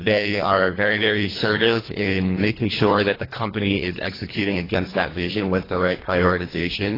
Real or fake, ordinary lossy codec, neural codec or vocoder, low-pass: fake; AAC, 24 kbps; codec, 24 kHz, 1.5 kbps, HILCodec; 5.4 kHz